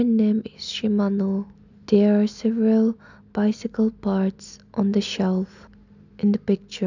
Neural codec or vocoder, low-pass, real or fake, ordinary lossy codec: none; 7.2 kHz; real; none